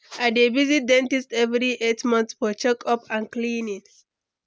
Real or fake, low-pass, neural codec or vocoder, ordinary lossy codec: real; none; none; none